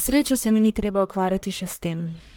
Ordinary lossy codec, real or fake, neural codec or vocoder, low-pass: none; fake; codec, 44.1 kHz, 1.7 kbps, Pupu-Codec; none